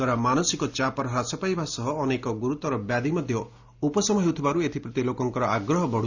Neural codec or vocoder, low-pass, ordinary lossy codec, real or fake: none; 7.2 kHz; Opus, 64 kbps; real